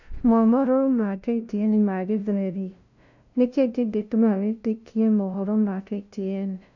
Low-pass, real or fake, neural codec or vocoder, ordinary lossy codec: 7.2 kHz; fake; codec, 16 kHz, 0.5 kbps, FunCodec, trained on LibriTTS, 25 frames a second; none